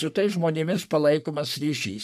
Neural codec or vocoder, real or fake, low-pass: codec, 44.1 kHz, 3.4 kbps, Pupu-Codec; fake; 14.4 kHz